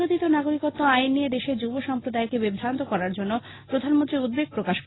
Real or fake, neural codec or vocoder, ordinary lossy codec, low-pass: real; none; AAC, 16 kbps; 7.2 kHz